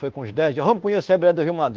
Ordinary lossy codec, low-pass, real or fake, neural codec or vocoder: Opus, 16 kbps; 7.2 kHz; fake; codec, 24 kHz, 1.2 kbps, DualCodec